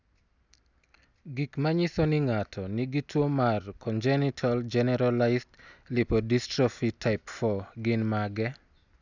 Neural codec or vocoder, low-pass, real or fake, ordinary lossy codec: none; 7.2 kHz; real; none